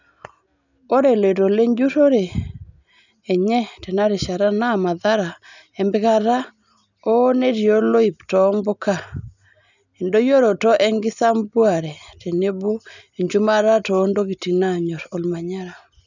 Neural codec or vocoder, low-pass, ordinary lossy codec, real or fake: none; 7.2 kHz; none; real